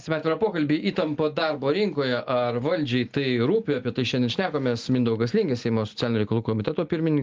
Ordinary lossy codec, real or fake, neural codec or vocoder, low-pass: Opus, 32 kbps; real; none; 7.2 kHz